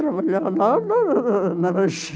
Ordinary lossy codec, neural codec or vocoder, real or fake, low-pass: none; none; real; none